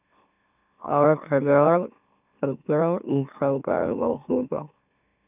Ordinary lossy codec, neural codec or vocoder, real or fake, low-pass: none; autoencoder, 44.1 kHz, a latent of 192 numbers a frame, MeloTTS; fake; 3.6 kHz